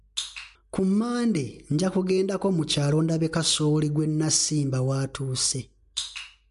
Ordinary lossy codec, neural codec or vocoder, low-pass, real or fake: MP3, 64 kbps; none; 10.8 kHz; real